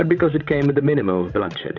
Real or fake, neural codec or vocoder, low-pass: fake; codec, 16 kHz, 16 kbps, FreqCodec, larger model; 7.2 kHz